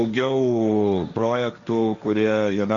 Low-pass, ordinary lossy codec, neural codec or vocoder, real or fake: 7.2 kHz; Opus, 64 kbps; codec, 16 kHz, 1.1 kbps, Voila-Tokenizer; fake